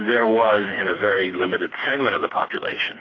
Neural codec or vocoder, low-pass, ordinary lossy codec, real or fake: codec, 16 kHz, 2 kbps, FreqCodec, smaller model; 7.2 kHz; AAC, 32 kbps; fake